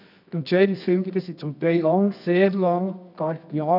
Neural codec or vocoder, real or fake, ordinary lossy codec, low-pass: codec, 24 kHz, 0.9 kbps, WavTokenizer, medium music audio release; fake; none; 5.4 kHz